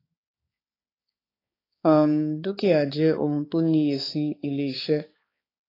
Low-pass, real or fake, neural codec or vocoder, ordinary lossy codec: 5.4 kHz; fake; codec, 16 kHz, 4 kbps, X-Codec, HuBERT features, trained on balanced general audio; AAC, 24 kbps